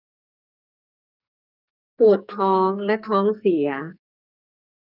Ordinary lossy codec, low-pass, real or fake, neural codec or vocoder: none; 5.4 kHz; fake; codec, 44.1 kHz, 2.6 kbps, SNAC